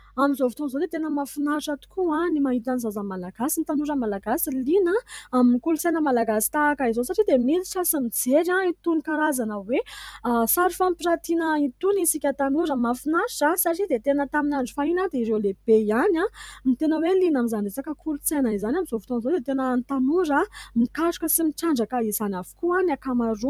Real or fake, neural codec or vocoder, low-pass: fake; vocoder, 44.1 kHz, 128 mel bands every 256 samples, BigVGAN v2; 19.8 kHz